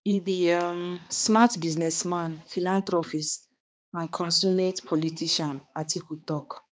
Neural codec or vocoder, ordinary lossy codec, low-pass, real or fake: codec, 16 kHz, 2 kbps, X-Codec, HuBERT features, trained on balanced general audio; none; none; fake